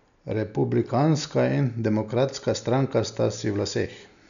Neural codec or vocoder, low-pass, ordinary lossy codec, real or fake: none; 7.2 kHz; none; real